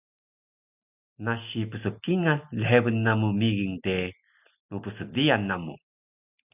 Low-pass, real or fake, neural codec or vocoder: 3.6 kHz; real; none